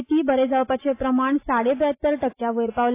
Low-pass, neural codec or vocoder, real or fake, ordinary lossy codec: 3.6 kHz; none; real; AAC, 24 kbps